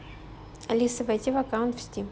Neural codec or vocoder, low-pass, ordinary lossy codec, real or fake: none; none; none; real